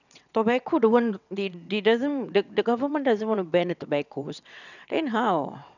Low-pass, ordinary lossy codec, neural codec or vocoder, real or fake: 7.2 kHz; none; vocoder, 22.05 kHz, 80 mel bands, WaveNeXt; fake